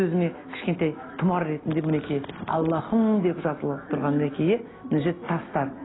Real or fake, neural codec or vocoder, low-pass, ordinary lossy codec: real; none; 7.2 kHz; AAC, 16 kbps